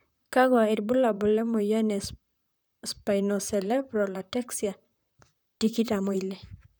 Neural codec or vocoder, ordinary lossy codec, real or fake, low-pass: vocoder, 44.1 kHz, 128 mel bands, Pupu-Vocoder; none; fake; none